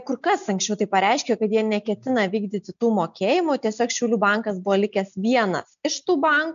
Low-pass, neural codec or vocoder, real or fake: 7.2 kHz; none; real